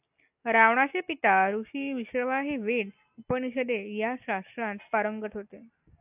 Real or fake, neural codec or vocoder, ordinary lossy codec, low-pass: real; none; AAC, 32 kbps; 3.6 kHz